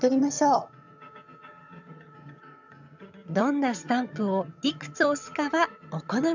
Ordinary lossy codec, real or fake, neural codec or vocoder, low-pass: none; fake; vocoder, 22.05 kHz, 80 mel bands, HiFi-GAN; 7.2 kHz